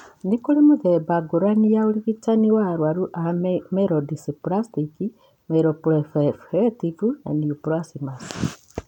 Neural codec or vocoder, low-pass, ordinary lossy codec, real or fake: none; 19.8 kHz; none; real